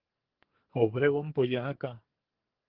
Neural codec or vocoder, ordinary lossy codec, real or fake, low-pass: codec, 44.1 kHz, 2.6 kbps, SNAC; Opus, 16 kbps; fake; 5.4 kHz